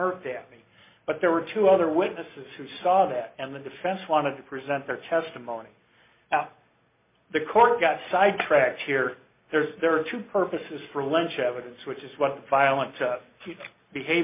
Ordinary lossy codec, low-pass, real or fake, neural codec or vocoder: MP3, 32 kbps; 3.6 kHz; real; none